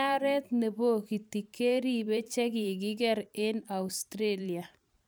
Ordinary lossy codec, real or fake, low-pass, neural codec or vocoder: none; fake; none; vocoder, 44.1 kHz, 128 mel bands every 512 samples, BigVGAN v2